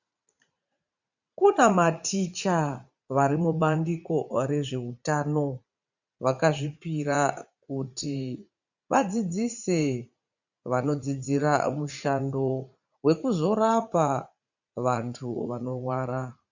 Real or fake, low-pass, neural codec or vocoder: fake; 7.2 kHz; vocoder, 44.1 kHz, 80 mel bands, Vocos